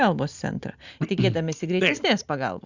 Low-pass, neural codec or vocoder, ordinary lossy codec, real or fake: 7.2 kHz; none; Opus, 64 kbps; real